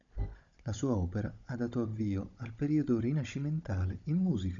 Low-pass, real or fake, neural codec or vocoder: 7.2 kHz; fake; codec, 16 kHz, 16 kbps, FunCodec, trained on Chinese and English, 50 frames a second